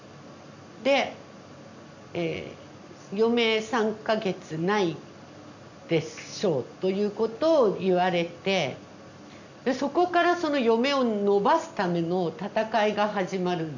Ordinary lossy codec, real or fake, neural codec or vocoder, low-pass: none; real; none; 7.2 kHz